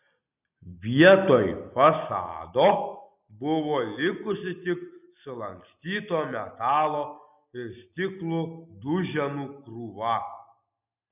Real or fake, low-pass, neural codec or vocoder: real; 3.6 kHz; none